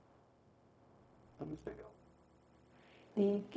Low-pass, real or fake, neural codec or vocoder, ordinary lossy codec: none; fake; codec, 16 kHz, 0.4 kbps, LongCat-Audio-Codec; none